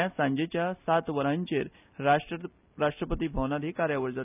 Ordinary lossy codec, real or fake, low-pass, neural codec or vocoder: none; real; 3.6 kHz; none